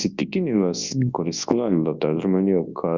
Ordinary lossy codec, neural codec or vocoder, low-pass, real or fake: Opus, 64 kbps; codec, 24 kHz, 0.9 kbps, WavTokenizer, large speech release; 7.2 kHz; fake